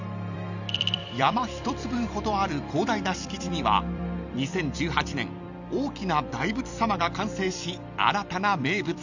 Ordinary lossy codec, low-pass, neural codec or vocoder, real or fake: none; 7.2 kHz; vocoder, 44.1 kHz, 128 mel bands every 256 samples, BigVGAN v2; fake